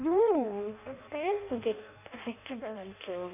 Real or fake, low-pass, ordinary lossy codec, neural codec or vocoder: fake; 3.6 kHz; none; codec, 16 kHz in and 24 kHz out, 0.6 kbps, FireRedTTS-2 codec